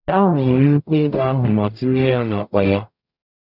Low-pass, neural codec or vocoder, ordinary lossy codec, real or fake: 5.4 kHz; codec, 44.1 kHz, 0.9 kbps, DAC; none; fake